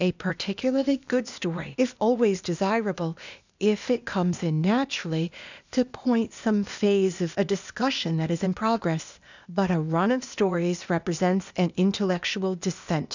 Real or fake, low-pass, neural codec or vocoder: fake; 7.2 kHz; codec, 16 kHz, 0.8 kbps, ZipCodec